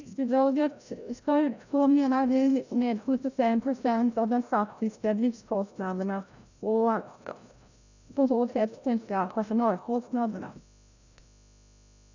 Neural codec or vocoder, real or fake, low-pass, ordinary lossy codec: codec, 16 kHz, 0.5 kbps, FreqCodec, larger model; fake; 7.2 kHz; none